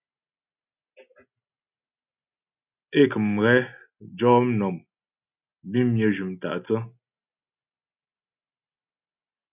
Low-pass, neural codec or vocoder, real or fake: 3.6 kHz; none; real